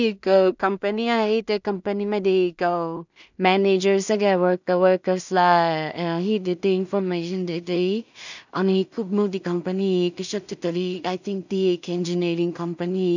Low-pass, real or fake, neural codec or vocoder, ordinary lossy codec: 7.2 kHz; fake; codec, 16 kHz in and 24 kHz out, 0.4 kbps, LongCat-Audio-Codec, two codebook decoder; none